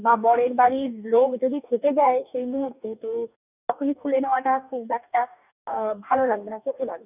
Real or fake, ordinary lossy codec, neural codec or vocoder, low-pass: fake; none; codec, 44.1 kHz, 2.6 kbps, DAC; 3.6 kHz